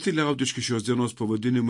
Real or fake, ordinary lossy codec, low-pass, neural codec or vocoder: real; MP3, 48 kbps; 10.8 kHz; none